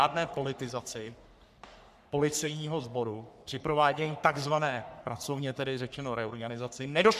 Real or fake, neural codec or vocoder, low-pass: fake; codec, 44.1 kHz, 3.4 kbps, Pupu-Codec; 14.4 kHz